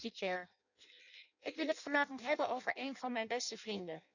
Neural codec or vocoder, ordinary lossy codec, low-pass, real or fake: codec, 16 kHz in and 24 kHz out, 0.6 kbps, FireRedTTS-2 codec; none; 7.2 kHz; fake